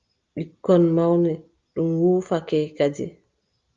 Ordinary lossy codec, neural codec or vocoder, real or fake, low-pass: Opus, 32 kbps; none; real; 7.2 kHz